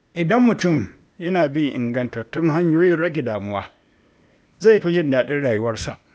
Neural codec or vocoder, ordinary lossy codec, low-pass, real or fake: codec, 16 kHz, 0.8 kbps, ZipCodec; none; none; fake